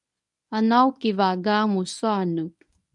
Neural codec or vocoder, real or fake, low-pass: codec, 24 kHz, 0.9 kbps, WavTokenizer, medium speech release version 1; fake; 10.8 kHz